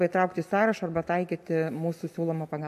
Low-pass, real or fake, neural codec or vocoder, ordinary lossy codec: 14.4 kHz; real; none; MP3, 64 kbps